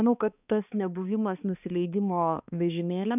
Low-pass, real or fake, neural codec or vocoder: 3.6 kHz; fake; codec, 16 kHz, 2 kbps, X-Codec, HuBERT features, trained on balanced general audio